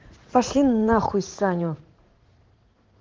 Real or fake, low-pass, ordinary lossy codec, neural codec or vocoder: fake; 7.2 kHz; Opus, 16 kbps; vocoder, 44.1 kHz, 80 mel bands, Vocos